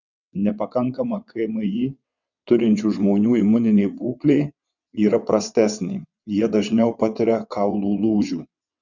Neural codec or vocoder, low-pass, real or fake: vocoder, 22.05 kHz, 80 mel bands, WaveNeXt; 7.2 kHz; fake